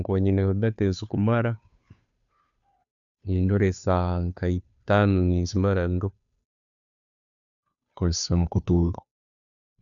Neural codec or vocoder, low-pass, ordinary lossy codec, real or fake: codec, 16 kHz, 2 kbps, FunCodec, trained on Chinese and English, 25 frames a second; 7.2 kHz; none; fake